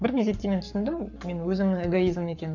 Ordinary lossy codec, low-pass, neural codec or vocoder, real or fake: none; 7.2 kHz; codec, 44.1 kHz, 7.8 kbps, Pupu-Codec; fake